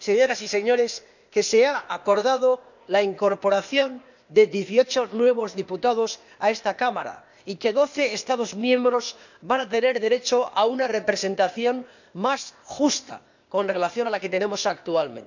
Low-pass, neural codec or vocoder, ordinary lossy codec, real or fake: 7.2 kHz; codec, 16 kHz, 0.8 kbps, ZipCodec; none; fake